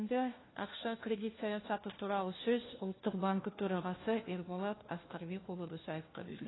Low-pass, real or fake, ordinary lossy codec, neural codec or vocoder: 7.2 kHz; fake; AAC, 16 kbps; codec, 16 kHz, 1 kbps, FunCodec, trained on LibriTTS, 50 frames a second